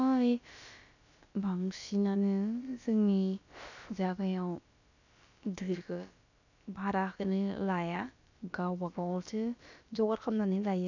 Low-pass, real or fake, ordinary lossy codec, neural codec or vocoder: 7.2 kHz; fake; none; codec, 16 kHz, about 1 kbps, DyCAST, with the encoder's durations